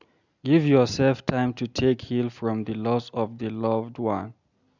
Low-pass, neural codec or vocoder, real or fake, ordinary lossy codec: 7.2 kHz; none; real; none